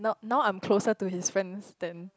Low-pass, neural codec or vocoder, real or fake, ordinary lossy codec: none; none; real; none